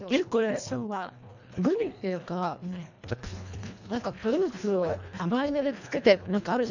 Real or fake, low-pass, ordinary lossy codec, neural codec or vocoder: fake; 7.2 kHz; none; codec, 24 kHz, 1.5 kbps, HILCodec